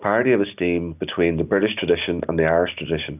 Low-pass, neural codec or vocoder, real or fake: 3.6 kHz; none; real